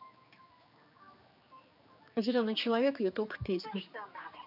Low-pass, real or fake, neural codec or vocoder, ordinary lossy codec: 5.4 kHz; fake; codec, 16 kHz, 4 kbps, X-Codec, HuBERT features, trained on general audio; AAC, 48 kbps